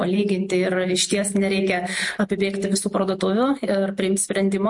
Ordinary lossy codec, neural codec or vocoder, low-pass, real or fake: MP3, 48 kbps; none; 10.8 kHz; real